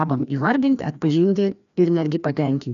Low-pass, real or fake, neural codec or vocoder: 7.2 kHz; fake; codec, 16 kHz, 1 kbps, FreqCodec, larger model